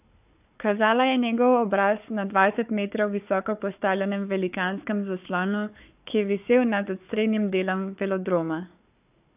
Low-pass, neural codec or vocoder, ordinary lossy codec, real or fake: 3.6 kHz; codec, 16 kHz, 4 kbps, FunCodec, trained on Chinese and English, 50 frames a second; AAC, 32 kbps; fake